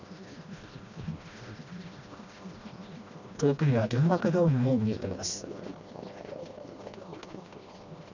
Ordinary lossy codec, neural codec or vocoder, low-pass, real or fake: none; codec, 16 kHz, 1 kbps, FreqCodec, smaller model; 7.2 kHz; fake